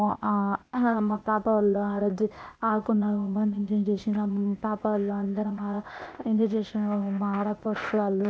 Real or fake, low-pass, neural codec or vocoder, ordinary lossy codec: fake; none; codec, 16 kHz, 0.8 kbps, ZipCodec; none